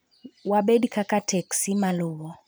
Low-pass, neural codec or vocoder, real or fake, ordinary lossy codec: none; vocoder, 44.1 kHz, 128 mel bands every 512 samples, BigVGAN v2; fake; none